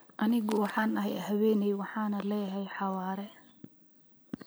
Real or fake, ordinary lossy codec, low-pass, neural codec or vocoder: fake; none; none; vocoder, 44.1 kHz, 128 mel bands every 256 samples, BigVGAN v2